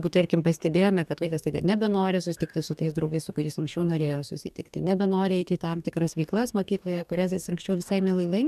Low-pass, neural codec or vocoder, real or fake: 14.4 kHz; codec, 44.1 kHz, 2.6 kbps, DAC; fake